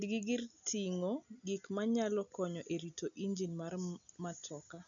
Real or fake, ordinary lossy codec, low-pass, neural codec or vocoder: real; none; 7.2 kHz; none